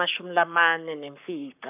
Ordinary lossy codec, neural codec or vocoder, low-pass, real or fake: none; codec, 44.1 kHz, 7.8 kbps, Pupu-Codec; 3.6 kHz; fake